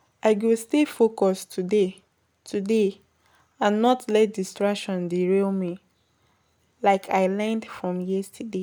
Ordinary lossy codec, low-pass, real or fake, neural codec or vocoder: none; none; real; none